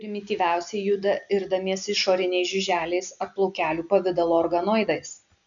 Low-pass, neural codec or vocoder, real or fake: 7.2 kHz; none; real